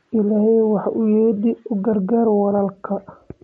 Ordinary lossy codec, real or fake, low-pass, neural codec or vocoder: MP3, 48 kbps; real; 19.8 kHz; none